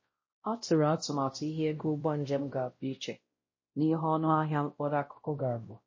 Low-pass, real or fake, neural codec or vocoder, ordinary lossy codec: 7.2 kHz; fake; codec, 16 kHz, 0.5 kbps, X-Codec, WavLM features, trained on Multilingual LibriSpeech; MP3, 32 kbps